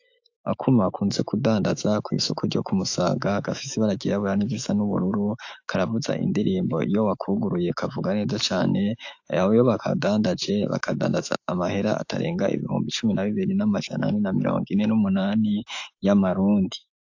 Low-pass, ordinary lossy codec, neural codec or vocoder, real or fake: 7.2 kHz; AAC, 48 kbps; autoencoder, 48 kHz, 128 numbers a frame, DAC-VAE, trained on Japanese speech; fake